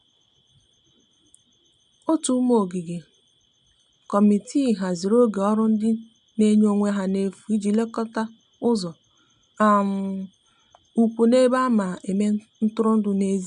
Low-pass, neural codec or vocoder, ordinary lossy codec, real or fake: 10.8 kHz; none; none; real